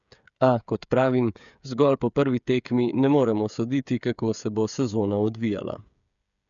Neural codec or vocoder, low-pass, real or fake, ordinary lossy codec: codec, 16 kHz, 8 kbps, FreqCodec, smaller model; 7.2 kHz; fake; none